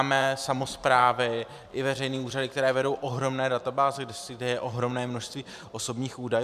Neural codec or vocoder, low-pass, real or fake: vocoder, 44.1 kHz, 128 mel bands every 512 samples, BigVGAN v2; 14.4 kHz; fake